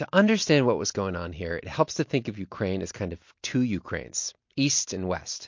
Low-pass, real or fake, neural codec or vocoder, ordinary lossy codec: 7.2 kHz; real; none; MP3, 48 kbps